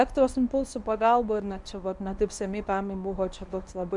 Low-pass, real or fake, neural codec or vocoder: 10.8 kHz; fake; codec, 24 kHz, 0.9 kbps, WavTokenizer, medium speech release version 1